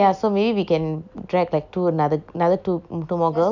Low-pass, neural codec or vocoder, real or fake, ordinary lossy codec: 7.2 kHz; none; real; none